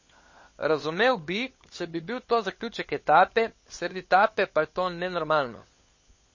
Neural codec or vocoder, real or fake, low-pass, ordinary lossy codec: codec, 16 kHz, 16 kbps, FunCodec, trained on LibriTTS, 50 frames a second; fake; 7.2 kHz; MP3, 32 kbps